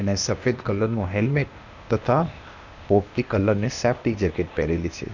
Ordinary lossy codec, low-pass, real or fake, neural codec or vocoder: none; 7.2 kHz; fake; codec, 16 kHz, 0.8 kbps, ZipCodec